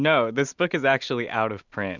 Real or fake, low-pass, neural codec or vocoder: real; 7.2 kHz; none